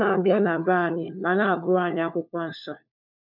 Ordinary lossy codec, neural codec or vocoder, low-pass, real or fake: none; codec, 16 kHz, 4 kbps, FunCodec, trained on LibriTTS, 50 frames a second; 5.4 kHz; fake